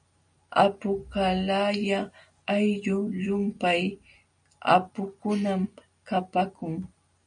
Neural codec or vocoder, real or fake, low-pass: none; real; 9.9 kHz